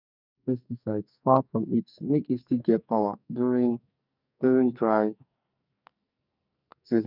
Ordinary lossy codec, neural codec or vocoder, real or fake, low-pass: none; codec, 44.1 kHz, 2.6 kbps, SNAC; fake; 5.4 kHz